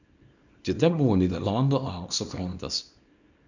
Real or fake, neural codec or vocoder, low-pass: fake; codec, 24 kHz, 0.9 kbps, WavTokenizer, small release; 7.2 kHz